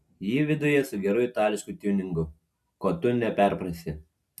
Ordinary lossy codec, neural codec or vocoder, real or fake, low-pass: MP3, 96 kbps; none; real; 14.4 kHz